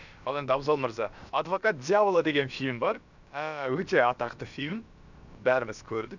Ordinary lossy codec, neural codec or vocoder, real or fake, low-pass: none; codec, 16 kHz, about 1 kbps, DyCAST, with the encoder's durations; fake; 7.2 kHz